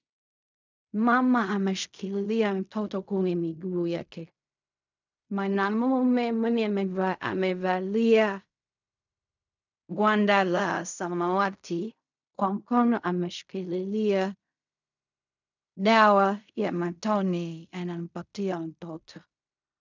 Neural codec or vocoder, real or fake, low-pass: codec, 16 kHz in and 24 kHz out, 0.4 kbps, LongCat-Audio-Codec, fine tuned four codebook decoder; fake; 7.2 kHz